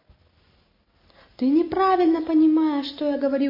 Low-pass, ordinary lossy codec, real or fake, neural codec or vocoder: 5.4 kHz; MP3, 24 kbps; real; none